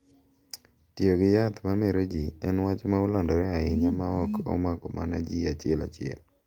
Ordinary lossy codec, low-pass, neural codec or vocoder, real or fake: Opus, 32 kbps; 19.8 kHz; none; real